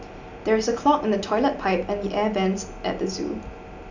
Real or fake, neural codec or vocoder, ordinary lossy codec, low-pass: real; none; none; 7.2 kHz